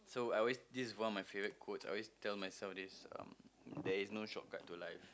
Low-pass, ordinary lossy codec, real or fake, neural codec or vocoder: none; none; real; none